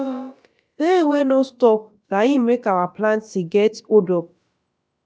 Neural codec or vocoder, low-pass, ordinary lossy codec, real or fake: codec, 16 kHz, about 1 kbps, DyCAST, with the encoder's durations; none; none; fake